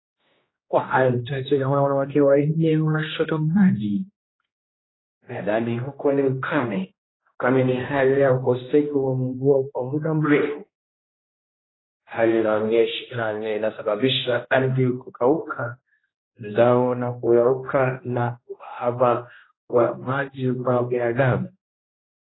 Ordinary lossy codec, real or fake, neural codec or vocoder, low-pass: AAC, 16 kbps; fake; codec, 16 kHz, 1 kbps, X-Codec, HuBERT features, trained on general audio; 7.2 kHz